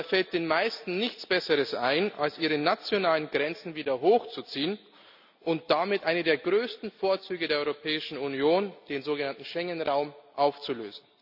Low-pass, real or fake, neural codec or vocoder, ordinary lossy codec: 5.4 kHz; real; none; none